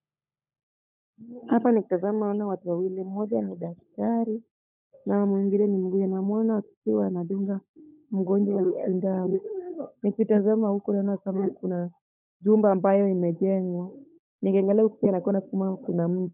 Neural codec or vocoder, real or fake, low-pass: codec, 16 kHz, 16 kbps, FunCodec, trained on LibriTTS, 50 frames a second; fake; 3.6 kHz